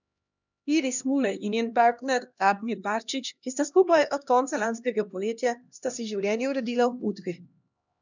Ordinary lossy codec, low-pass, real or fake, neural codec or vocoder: none; 7.2 kHz; fake; codec, 16 kHz, 1 kbps, X-Codec, HuBERT features, trained on LibriSpeech